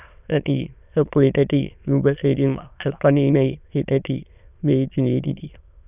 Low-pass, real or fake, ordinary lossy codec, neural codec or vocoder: 3.6 kHz; fake; none; autoencoder, 22.05 kHz, a latent of 192 numbers a frame, VITS, trained on many speakers